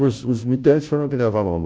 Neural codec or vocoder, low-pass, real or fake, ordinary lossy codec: codec, 16 kHz, 0.5 kbps, FunCodec, trained on Chinese and English, 25 frames a second; none; fake; none